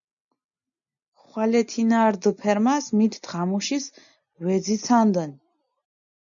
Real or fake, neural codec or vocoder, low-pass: real; none; 7.2 kHz